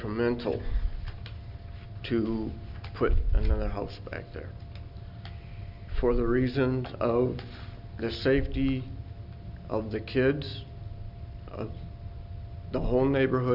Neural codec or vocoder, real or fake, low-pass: none; real; 5.4 kHz